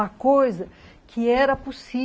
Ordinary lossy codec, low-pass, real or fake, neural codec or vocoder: none; none; real; none